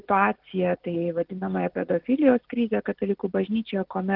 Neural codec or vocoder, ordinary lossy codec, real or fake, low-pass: none; Opus, 16 kbps; real; 5.4 kHz